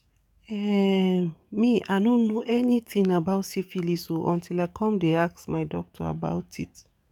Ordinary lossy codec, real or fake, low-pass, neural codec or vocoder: none; fake; 19.8 kHz; codec, 44.1 kHz, 7.8 kbps, DAC